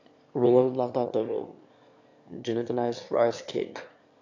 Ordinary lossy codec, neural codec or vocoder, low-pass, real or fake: AAC, 48 kbps; autoencoder, 22.05 kHz, a latent of 192 numbers a frame, VITS, trained on one speaker; 7.2 kHz; fake